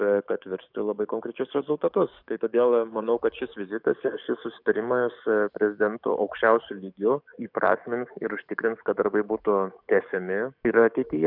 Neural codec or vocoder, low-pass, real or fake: codec, 44.1 kHz, 7.8 kbps, DAC; 5.4 kHz; fake